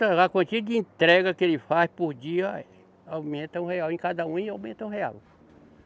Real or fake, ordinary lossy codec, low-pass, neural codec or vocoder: real; none; none; none